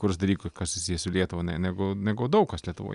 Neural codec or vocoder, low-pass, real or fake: none; 10.8 kHz; real